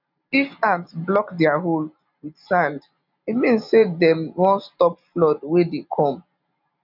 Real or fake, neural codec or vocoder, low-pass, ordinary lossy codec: real; none; 5.4 kHz; none